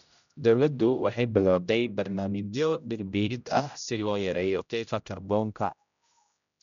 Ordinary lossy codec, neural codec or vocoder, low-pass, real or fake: none; codec, 16 kHz, 0.5 kbps, X-Codec, HuBERT features, trained on general audio; 7.2 kHz; fake